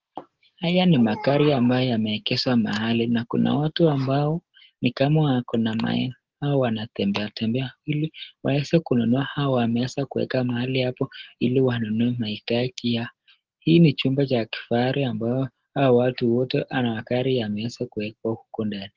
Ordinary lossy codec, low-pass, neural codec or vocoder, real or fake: Opus, 16 kbps; 7.2 kHz; none; real